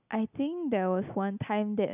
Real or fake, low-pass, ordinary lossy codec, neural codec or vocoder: real; 3.6 kHz; none; none